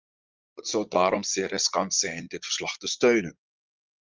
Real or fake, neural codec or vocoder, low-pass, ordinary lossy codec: fake; vocoder, 44.1 kHz, 80 mel bands, Vocos; 7.2 kHz; Opus, 32 kbps